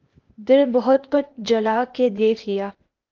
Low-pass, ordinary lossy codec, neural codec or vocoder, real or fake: 7.2 kHz; Opus, 32 kbps; codec, 16 kHz, 0.8 kbps, ZipCodec; fake